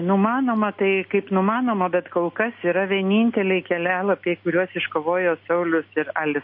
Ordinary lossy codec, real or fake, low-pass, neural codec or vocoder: MP3, 32 kbps; real; 5.4 kHz; none